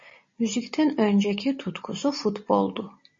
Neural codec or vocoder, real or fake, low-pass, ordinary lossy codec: none; real; 7.2 kHz; MP3, 32 kbps